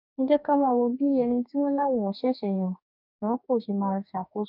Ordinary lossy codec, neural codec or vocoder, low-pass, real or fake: none; codec, 44.1 kHz, 2.6 kbps, DAC; 5.4 kHz; fake